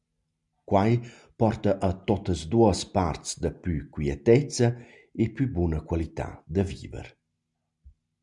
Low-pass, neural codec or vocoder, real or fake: 10.8 kHz; none; real